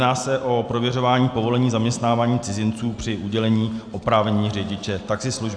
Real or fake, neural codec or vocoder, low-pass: real; none; 9.9 kHz